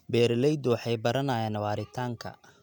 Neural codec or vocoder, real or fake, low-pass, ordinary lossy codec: none; real; 19.8 kHz; none